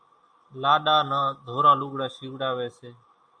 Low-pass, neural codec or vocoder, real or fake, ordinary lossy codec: 9.9 kHz; none; real; AAC, 64 kbps